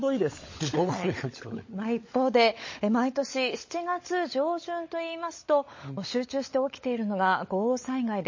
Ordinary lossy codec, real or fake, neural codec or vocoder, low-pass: MP3, 32 kbps; fake; codec, 16 kHz, 16 kbps, FunCodec, trained on LibriTTS, 50 frames a second; 7.2 kHz